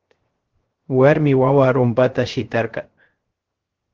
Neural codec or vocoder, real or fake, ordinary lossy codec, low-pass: codec, 16 kHz, 0.3 kbps, FocalCodec; fake; Opus, 16 kbps; 7.2 kHz